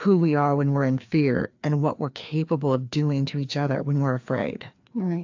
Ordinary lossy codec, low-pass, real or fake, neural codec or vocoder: AAC, 48 kbps; 7.2 kHz; fake; codec, 16 kHz, 2 kbps, FreqCodec, larger model